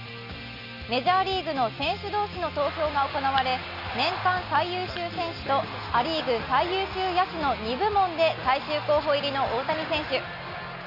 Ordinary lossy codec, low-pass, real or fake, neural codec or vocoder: none; 5.4 kHz; real; none